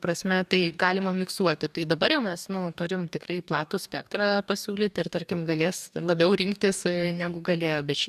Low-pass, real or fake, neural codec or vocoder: 14.4 kHz; fake; codec, 44.1 kHz, 2.6 kbps, DAC